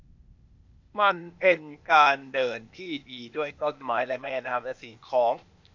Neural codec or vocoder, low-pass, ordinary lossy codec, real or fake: codec, 16 kHz, 0.8 kbps, ZipCodec; 7.2 kHz; AAC, 48 kbps; fake